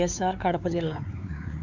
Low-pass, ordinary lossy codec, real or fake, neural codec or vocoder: 7.2 kHz; none; fake; codec, 16 kHz, 4 kbps, X-Codec, WavLM features, trained on Multilingual LibriSpeech